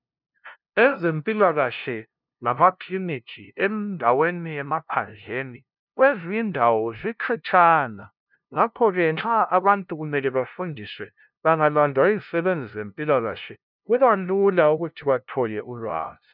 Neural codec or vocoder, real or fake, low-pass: codec, 16 kHz, 0.5 kbps, FunCodec, trained on LibriTTS, 25 frames a second; fake; 5.4 kHz